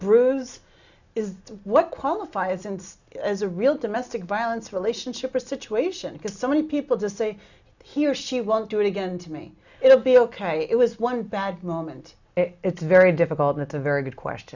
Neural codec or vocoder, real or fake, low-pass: none; real; 7.2 kHz